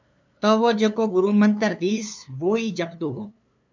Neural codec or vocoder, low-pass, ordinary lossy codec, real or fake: codec, 16 kHz, 2 kbps, FunCodec, trained on LibriTTS, 25 frames a second; 7.2 kHz; MP3, 64 kbps; fake